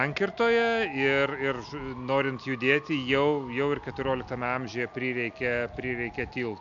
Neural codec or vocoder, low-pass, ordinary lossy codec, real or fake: none; 7.2 kHz; AAC, 64 kbps; real